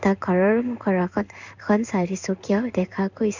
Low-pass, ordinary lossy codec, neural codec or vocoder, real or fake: 7.2 kHz; none; codec, 16 kHz in and 24 kHz out, 1 kbps, XY-Tokenizer; fake